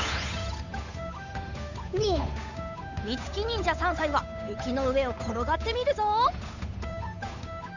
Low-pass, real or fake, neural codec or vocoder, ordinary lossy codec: 7.2 kHz; fake; codec, 16 kHz, 8 kbps, FunCodec, trained on Chinese and English, 25 frames a second; none